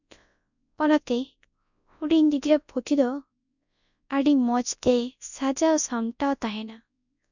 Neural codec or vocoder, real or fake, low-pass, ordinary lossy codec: codec, 24 kHz, 0.9 kbps, WavTokenizer, large speech release; fake; 7.2 kHz; none